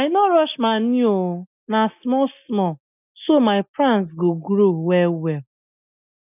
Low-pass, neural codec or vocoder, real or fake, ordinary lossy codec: 3.6 kHz; none; real; none